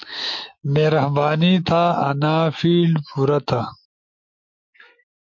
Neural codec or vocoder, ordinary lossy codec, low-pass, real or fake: autoencoder, 48 kHz, 128 numbers a frame, DAC-VAE, trained on Japanese speech; MP3, 48 kbps; 7.2 kHz; fake